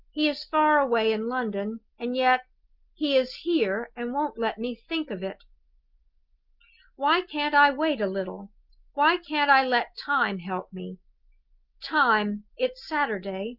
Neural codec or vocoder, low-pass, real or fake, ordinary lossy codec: none; 5.4 kHz; real; Opus, 24 kbps